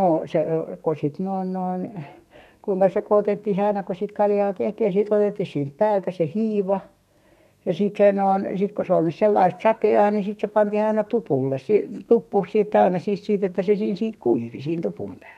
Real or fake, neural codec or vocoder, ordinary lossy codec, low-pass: fake; codec, 32 kHz, 1.9 kbps, SNAC; none; 14.4 kHz